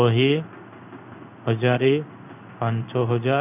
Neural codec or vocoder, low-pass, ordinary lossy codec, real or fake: codec, 16 kHz in and 24 kHz out, 1 kbps, XY-Tokenizer; 3.6 kHz; none; fake